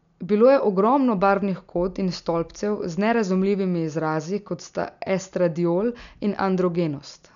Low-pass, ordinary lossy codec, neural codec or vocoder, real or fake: 7.2 kHz; none; none; real